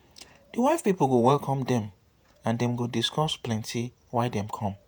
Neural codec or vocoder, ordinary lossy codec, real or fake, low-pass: vocoder, 48 kHz, 128 mel bands, Vocos; none; fake; none